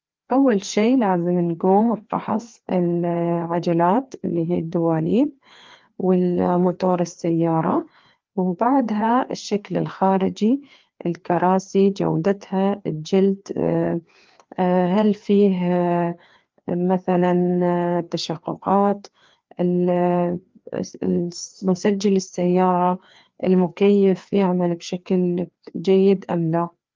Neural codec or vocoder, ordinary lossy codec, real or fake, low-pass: codec, 16 kHz, 2 kbps, FreqCodec, larger model; Opus, 32 kbps; fake; 7.2 kHz